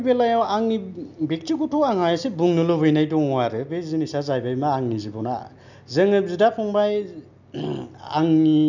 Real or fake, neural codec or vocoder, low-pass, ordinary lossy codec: real; none; 7.2 kHz; none